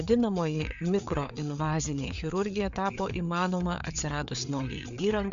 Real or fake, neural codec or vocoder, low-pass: fake; codec, 16 kHz, 4 kbps, FreqCodec, larger model; 7.2 kHz